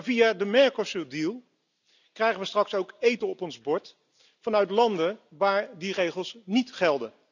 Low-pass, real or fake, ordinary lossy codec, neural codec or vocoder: 7.2 kHz; real; none; none